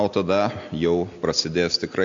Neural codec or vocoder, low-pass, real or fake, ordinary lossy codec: none; 7.2 kHz; real; MP3, 48 kbps